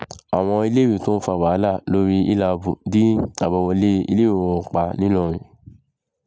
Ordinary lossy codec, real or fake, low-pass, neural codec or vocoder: none; real; none; none